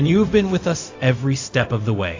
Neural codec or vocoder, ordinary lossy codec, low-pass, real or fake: codec, 16 kHz, 0.4 kbps, LongCat-Audio-Codec; AAC, 48 kbps; 7.2 kHz; fake